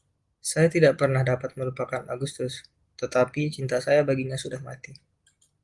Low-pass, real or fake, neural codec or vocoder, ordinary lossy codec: 10.8 kHz; real; none; Opus, 24 kbps